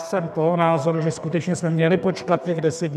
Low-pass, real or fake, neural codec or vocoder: 14.4 kHz; fake; codec, 32 kHz, 1.9 kbps, SNAC